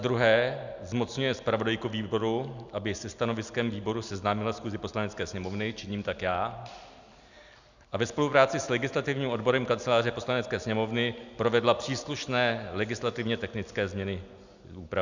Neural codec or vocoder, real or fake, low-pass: none; real; 7.2 kHz